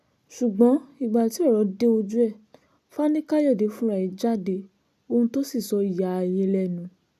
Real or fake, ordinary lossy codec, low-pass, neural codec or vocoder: real; none; 14.4 kHz; none